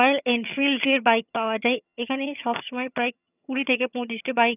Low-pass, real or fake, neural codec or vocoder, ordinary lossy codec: 3.6 kHz; fake; vocoder, 22.05 kHz, 80 mel bands, HiFi-GAN; none